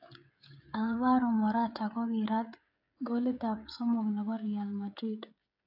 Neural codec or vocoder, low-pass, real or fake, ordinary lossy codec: codec, 16 kHz, 16 kbps, FreqCodec, smaller model; 5.4 kHz; fake; AAC, 48 kbps